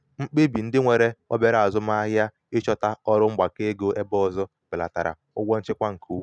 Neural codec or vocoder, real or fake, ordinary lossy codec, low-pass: none; real; none; none